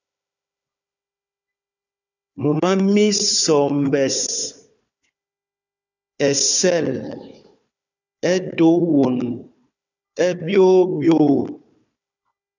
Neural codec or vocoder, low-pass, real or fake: codec, 16 kHz, 4 kbps, FunCodec, trained on Chinese and English, 50 frames a second; 7.2 kHz; fake